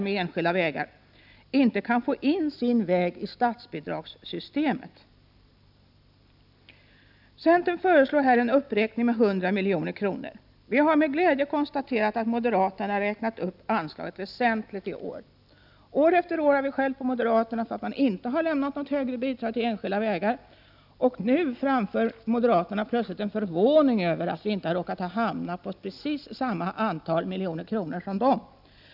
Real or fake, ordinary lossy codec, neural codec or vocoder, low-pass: real; none; none; 5.4 kHz